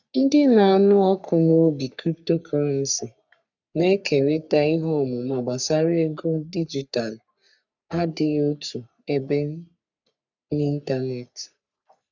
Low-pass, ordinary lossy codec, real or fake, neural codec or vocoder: 7.2 kHz; none; fake; codec, 44.1 kHz, 3.4 kbps, Pupu-Codec